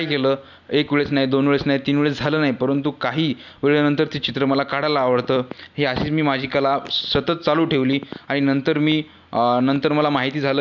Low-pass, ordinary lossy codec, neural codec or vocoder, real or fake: 7.2 kHz; none; none; real